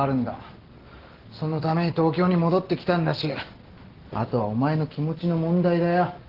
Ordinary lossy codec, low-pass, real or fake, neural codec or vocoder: Opus, 16 kbps; 5.4 kHz; real; none